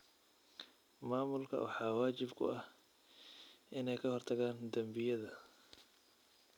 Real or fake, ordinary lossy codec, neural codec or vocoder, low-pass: real; none; none; 19.8 kHz